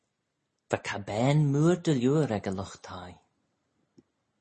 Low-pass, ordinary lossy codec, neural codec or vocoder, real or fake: 9.9 kHz; MP3, 32 kbps; none; real